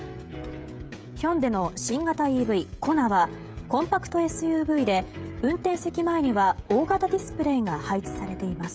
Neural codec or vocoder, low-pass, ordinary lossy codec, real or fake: codec, 16 kHz, 16 kbps, FreqCodec, smaller model; none; none; fake